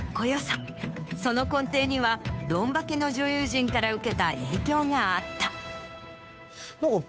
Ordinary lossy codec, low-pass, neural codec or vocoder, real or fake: none; none; codec, 16 kHz, 2 kbps, FunCodec, trained on Chinese and English, 25 frames a second; fake